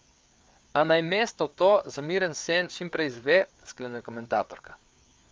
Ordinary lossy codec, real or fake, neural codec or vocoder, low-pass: none; fake; codec, 16 kHz, 4 kbps, FreqCodec, larger model; none